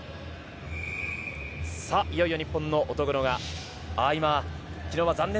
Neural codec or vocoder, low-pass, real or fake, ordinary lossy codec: none; none; real; none